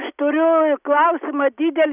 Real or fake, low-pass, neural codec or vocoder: real; 3.6 kHz; none